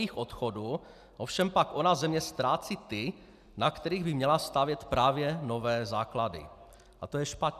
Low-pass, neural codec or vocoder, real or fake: 14.4 kHz; none; real